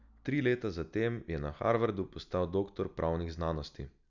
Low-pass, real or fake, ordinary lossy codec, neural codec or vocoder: 7.2 kHz; real; none; none